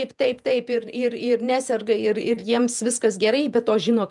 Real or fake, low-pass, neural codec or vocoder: fake; 10.8 kHz; vocoder, 48 kHz, 128 mel bands, Vocos